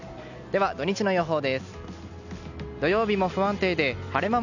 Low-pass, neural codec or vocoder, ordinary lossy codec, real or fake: 7.2 kHz; none; none; real